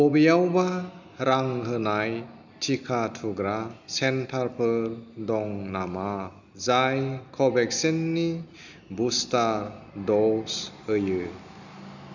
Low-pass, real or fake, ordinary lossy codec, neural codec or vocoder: 7.2 kHz; real; none; none